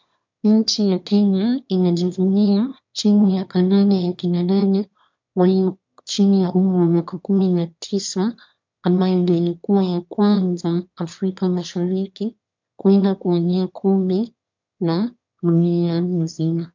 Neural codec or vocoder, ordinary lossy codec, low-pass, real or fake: autoencoder, 22.05 kHz, a latent of 192 numbers a frame, VITS, trained on one speaker; MP3, 64 kbps; 7.2 kHz; fake